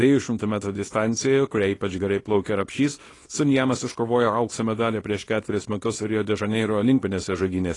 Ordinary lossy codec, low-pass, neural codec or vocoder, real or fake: AAC, 32 kbps; 10.8 kHz; codec, 24 kHz, 0.9 kbps, WavTokenizer, small release; fake